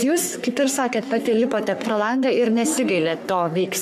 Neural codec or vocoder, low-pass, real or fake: codec, 44.1 kHz, 3.4 kbps, Pupu-Codec; 14.4 kHz; fake